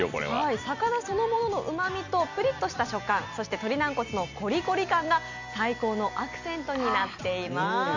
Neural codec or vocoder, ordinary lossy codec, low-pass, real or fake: none; none; 7.2 kHz; real